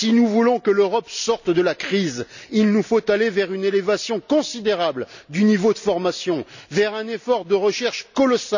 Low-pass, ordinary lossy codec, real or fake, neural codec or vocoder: 7.2 kHz; none; real; none